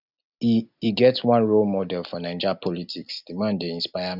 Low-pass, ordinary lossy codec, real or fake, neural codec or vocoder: 5.4 kHz; none; real; none